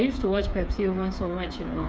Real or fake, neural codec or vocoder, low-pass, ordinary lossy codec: fake; codec, 16 kHz, 8 kbps, FreqCodec, smaller model; none; none